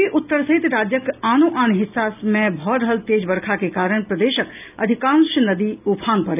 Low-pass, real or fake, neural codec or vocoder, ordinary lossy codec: 3.6 kHz; real; none; none